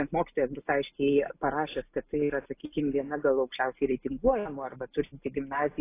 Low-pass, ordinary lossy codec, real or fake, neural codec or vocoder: 3.6 kHz; AAC, 24 kbps; real; none